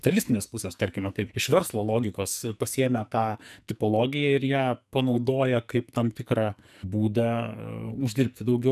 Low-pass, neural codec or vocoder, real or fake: 14.4 kHz; codec, 44.1 kHz, 2.6 kbps, SNAC; fake